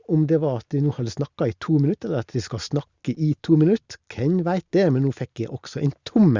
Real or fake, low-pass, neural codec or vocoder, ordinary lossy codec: real; 7.2 kHz; none; Opus, 64 kbps